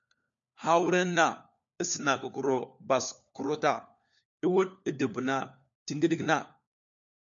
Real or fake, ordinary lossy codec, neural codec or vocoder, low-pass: fake; MP3, 64 kbps; codec, 16 kHz, 4 kbps, FunCodec, trained on LibriTTS, 50 frames a second; 7.2 kHz